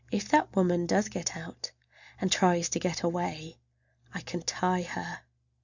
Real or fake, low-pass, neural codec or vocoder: real; 7.2 kHz; none